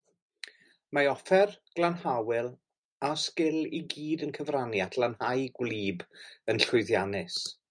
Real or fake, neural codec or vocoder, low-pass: real; none; 9.9 kHz